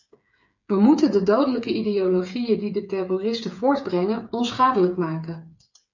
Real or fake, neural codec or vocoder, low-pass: fake; codec, 16 kHz, 8 kbps, FreqCodec, smaller model; 7.2 kHz